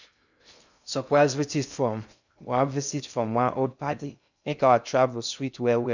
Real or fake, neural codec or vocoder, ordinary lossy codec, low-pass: fake; codec, 16 kHz in and 24 kHz out, 0.6 kbps, FocalCodec, streaming, 2048 codes; none; 7.2 kHz